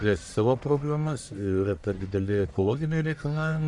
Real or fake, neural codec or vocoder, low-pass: fake; codec, 44.1 kHz, 1.7 kbps, Pupu-Codec; 10.8 kHz